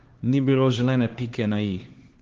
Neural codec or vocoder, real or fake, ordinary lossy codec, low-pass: codec, 16 kHz, 2 kbps, X-Codec, HuBERT features, trained on LibriSpeech; fake; Opus, 16 kbps; 7.2 kHz